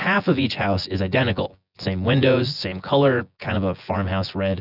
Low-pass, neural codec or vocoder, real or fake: 5.4 kHz; vocoder, 24 kHz, 100 mel bands, Vocos; fake